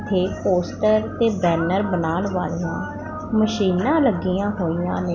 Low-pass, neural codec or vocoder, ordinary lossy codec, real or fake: 7.2 kHz; none; none; real